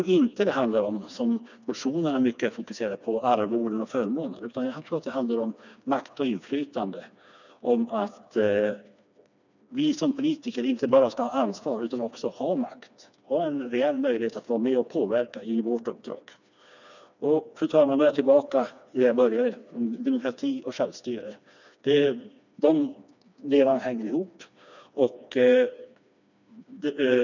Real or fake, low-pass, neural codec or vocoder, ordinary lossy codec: fake; 7.2 kHz; codec, 16 kHz, 2 kbps, FreqCodec, smaller model; none